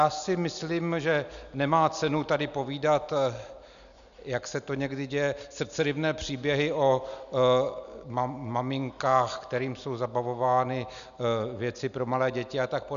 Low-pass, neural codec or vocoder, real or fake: 7.2 kHz; none; real